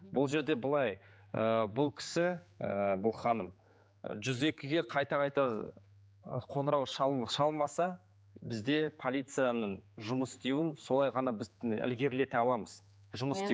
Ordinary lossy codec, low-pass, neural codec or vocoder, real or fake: none; none; codec, 16 kHz, 4 kbps, X-Codec, HuBERT features, trained on general audio; fake